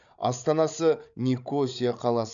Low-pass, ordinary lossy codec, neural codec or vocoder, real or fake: 7.2 kHz; none; codec, 16 kHz, 8 kbps, FreqCodec, larger model; fake